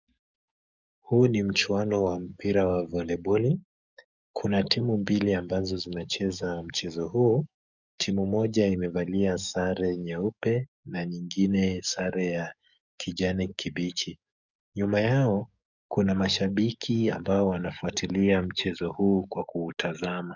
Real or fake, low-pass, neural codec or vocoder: fake; 7.2 kHz; codec, 44.1 kHz, 7.8 kbps, DAC